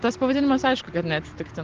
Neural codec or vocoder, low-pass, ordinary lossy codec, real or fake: none; 7.2 kHz; Opus, 16 kbps; real